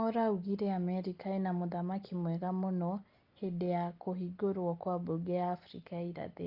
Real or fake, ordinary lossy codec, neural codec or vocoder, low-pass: real; Opus, 32 kbps; none; 5.4 kHz